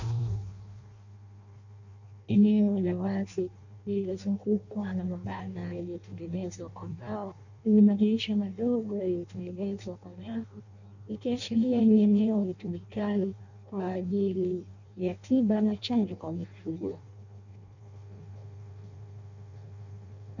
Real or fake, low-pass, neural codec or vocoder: fake; 7.2 kHz; codec, 16 kHz in and 24 kHz out, 0.6 kbps, FireRedTTS-2 codec